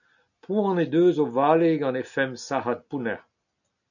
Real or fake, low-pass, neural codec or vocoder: real; 7.2 kHz; none